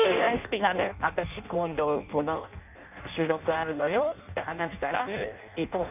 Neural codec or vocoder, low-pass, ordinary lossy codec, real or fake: codec, 16 kHz in and 24 kHz out, 0.6 kbps, FireRedTTS-2 codec; 3.6 kHz; none; fake